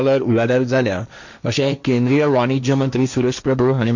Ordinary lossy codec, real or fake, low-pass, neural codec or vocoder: none; fake; 7.2 kHz; codec, 16 kHz, 1.1 kbps, Voila-Tokenizer